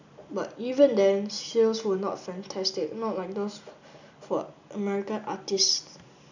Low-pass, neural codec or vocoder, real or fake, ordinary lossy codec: 7.2 kHz; none; real; none